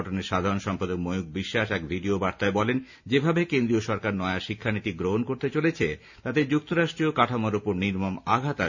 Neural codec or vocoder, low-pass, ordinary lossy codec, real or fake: none; 7.2 kHz; none; real